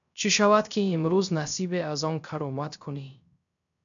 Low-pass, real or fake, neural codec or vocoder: 7.2 kHz; fake; codec, 16 kHz, 0.3 kbps, FocalCodec